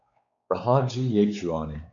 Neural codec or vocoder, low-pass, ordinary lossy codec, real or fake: codec, 16 kHz, 4 kbps, X-Codec, WavLM features, trained on Multilingual LibriSpeech; 7.2 kHz; AAC, 64 kbps; fake